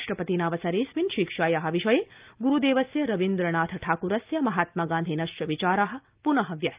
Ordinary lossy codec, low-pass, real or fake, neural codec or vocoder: Opus, 24 kbps; 3.6 kHz; real; none